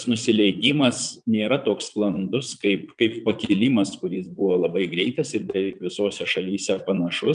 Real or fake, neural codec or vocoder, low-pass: fake; vocoder, 22.05 kHz, 80 mel bands, Vocos; 9.9 kHz